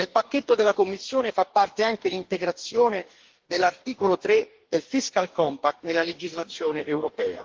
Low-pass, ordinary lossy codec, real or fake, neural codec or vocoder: 7.2 kHz; Opus, 16 kbps; fake; codec, 44.1 kHz, 2.6 kbps, DAC